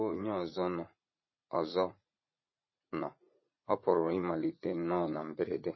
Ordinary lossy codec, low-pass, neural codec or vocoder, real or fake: MP3, 24 kbps; 7.2 kHz; vocoder, 44.1 kHz, 80 mel bands, Vocos; fake